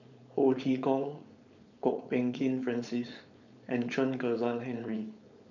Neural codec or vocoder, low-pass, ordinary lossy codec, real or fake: codec, 16 kHz, 4.8 kbps, FACodec; 7.2 kHz; none; fake